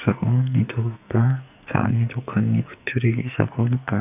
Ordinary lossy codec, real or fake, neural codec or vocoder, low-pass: none; fake; codec, 44.1 kHz, 2.6 kbps, SNAC; 3.6 kHz